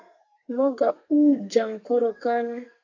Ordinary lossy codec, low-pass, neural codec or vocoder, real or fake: AAC, 48 kbps; 7.2 kHz; codec, 32 kHz, 1.9 kbps, SNAC; fake